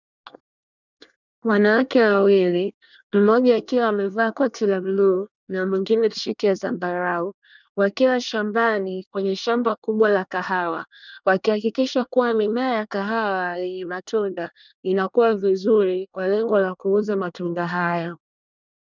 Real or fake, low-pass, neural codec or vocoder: fake; 7.2 kHz; codec, 24 kHz, 1 kbps, SNAC